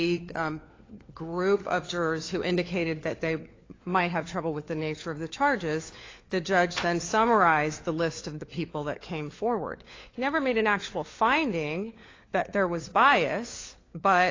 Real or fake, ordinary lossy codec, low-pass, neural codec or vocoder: fake; AAC, 32 kbps; 7.2 kHz; codec, 16 kHz, 4 kbps, FunCodec, trained on LibriTTS, 50 frames a second